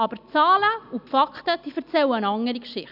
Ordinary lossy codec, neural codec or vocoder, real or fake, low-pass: none; none; real; 5.4 kHz